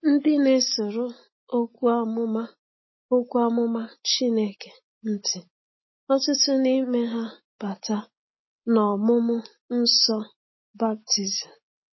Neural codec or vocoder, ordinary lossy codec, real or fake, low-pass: none; MP3, 24 kbps; real; 7.2 kHz